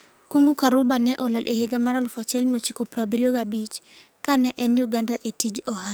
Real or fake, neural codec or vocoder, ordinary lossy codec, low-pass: fake; codec, 44.1 kHz, 2.6 kbps, SNAC; none; none